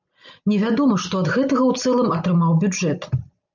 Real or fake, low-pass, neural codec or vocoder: real; 7.2 kHz; none